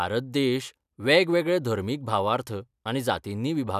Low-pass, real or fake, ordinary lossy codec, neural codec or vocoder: 14.4 kHz; real; none; none